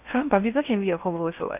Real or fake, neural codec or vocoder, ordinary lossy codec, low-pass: fake; codec, 16 kHz in and 24 kHz out, 0.8 kbps, FocalCodec, streaming, 65536 codes; none; 3.6 kHz